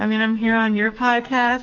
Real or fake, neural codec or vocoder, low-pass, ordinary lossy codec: fake; codec, 44.1 kHz, 2.6 kbps, SNAC; 7.2 kHz; MP3, 64 kbps